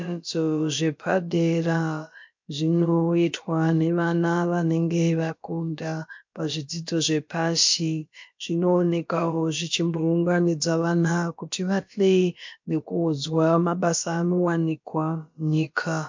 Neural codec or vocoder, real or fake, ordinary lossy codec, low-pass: codec, 16 kHz, about 1 kbps, DyCAST, with the encoder's durations; fake; MP3, 48 kbps; 7.2 kHz